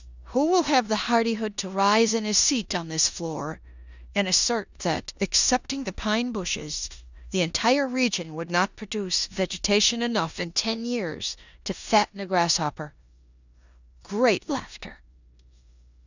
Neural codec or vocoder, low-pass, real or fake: codec, 16 kHz in and 24 kHz out, 0.9 kbps, LongCat-Audio-Codec, four codebook decoder; 7.2 kHz; fake